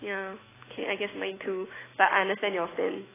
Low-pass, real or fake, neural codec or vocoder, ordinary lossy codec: 3.6 kHz; fake; autoencoder, 48 kHz, 128 numbers a frame, DAC-VAE, trained on Japanese speech; AAC, 16 kbps